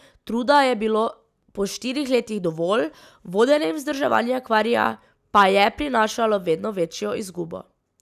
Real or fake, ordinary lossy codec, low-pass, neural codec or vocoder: real; none; 14.4 kHz; none